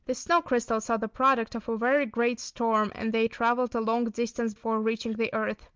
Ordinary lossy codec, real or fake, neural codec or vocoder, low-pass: Opus, 24 kbps; real; none; 7.2 kHz